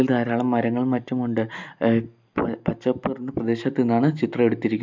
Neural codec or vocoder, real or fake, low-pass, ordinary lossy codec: none; real; 7.2 kHz; MP3, 64 kbps